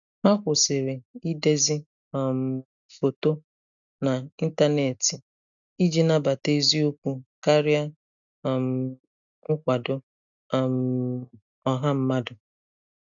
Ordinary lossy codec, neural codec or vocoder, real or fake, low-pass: none; none; real; 7.2 kHz